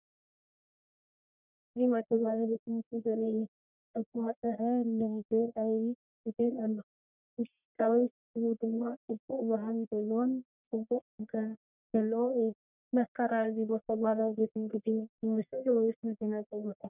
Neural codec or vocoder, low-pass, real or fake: codec, 44.1 kHz, 1.7 kbps, Pupu-Codec; 3.6 kHz; fake